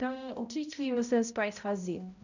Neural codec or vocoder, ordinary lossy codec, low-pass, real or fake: codec, 16 kHz, 0.5 kbps, X-Codec, HuBERT features, trained on balanced general audio; none; 7.2 kHz; fake